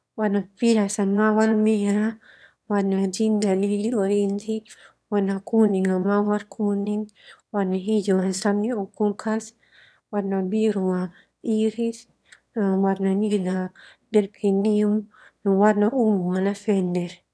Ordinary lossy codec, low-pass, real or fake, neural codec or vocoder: none; none; fake; autoencoder, 22.05 kHz, a latent of 192 numbers a frame, VITS, trained on one speaker